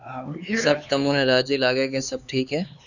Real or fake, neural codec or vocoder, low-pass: fake; codec, 16 kHz, 4 kbps, X-Codec, HuBERT features, trained on LibriSpeech; 7.2 kHz